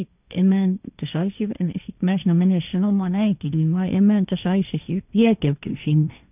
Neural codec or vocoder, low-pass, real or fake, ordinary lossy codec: codec, 16 kHz, 1.1 kbps, Voila-Tokenizer; 3.6 kHz; fake; none